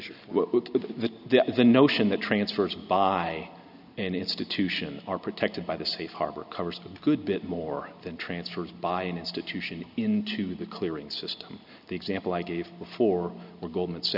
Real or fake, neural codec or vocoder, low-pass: real; none; 5.4 kHz